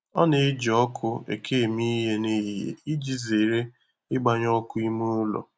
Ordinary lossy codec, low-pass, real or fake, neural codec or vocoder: none; none; real; none